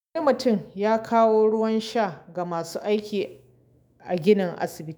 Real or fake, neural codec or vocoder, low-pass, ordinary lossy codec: fake; autoencoder, 48 kHz, 128 numbers a frame, DAC-VAE, trained on Japanese speech; none; none